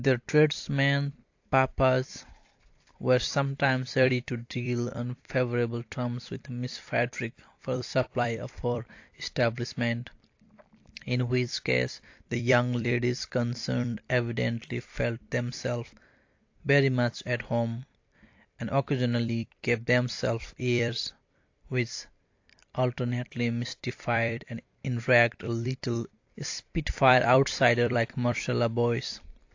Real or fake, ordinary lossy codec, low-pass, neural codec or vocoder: fake; AAC, 48 kbps; 7.2 kHz; vocoder, 44.1 kHz, 128 mel bands every 256 samples, BigVGAN v2